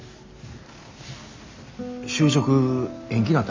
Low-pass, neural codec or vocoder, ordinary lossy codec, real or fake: 7.2 kHz; none; MP3, 64 kbps; real